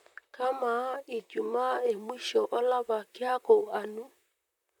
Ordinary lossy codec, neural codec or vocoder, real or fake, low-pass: none; vocoder, 44.1 kHz, 128 mel bands, Pupu-Vocoder; fake; 19.8 kHz